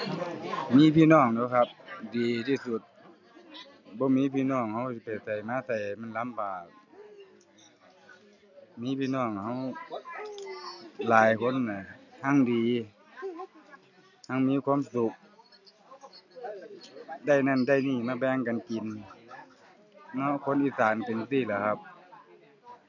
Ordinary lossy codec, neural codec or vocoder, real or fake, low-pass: none; none; real; 7.2 kHz